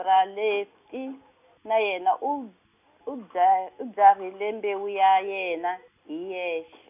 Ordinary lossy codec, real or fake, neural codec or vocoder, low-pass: none; real; none; 3.6 kHz